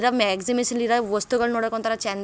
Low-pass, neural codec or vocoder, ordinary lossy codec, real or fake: none; none; none; real